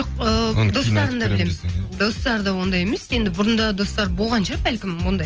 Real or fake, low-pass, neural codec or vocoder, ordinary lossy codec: real; 7.2 kHz; none; Opus, 24 kbps